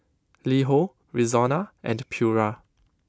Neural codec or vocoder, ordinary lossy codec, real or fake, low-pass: none; none; real; none